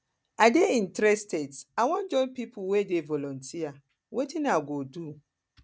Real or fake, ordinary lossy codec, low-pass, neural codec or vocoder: real; none; none; none